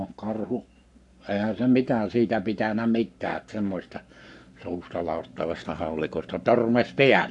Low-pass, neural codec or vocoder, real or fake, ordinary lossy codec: 10.8 kHz; codec, 44.1 kHz, 7.8 kbps, Pupu-Codec; fake; none